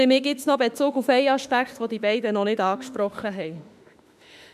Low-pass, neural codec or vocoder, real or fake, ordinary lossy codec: 14.4 kHz; autoencoder, 48 kHz, 32 numbers a frame, DAC-VAE, trained on Japanese speech; fake; none